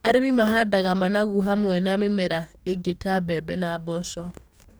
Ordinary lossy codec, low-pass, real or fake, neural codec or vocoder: none; none; fake; codec, 44.1 kHz, 2.6 kbps, DAC